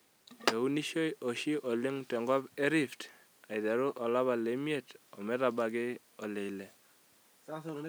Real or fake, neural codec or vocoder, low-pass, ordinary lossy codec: real; none; none; none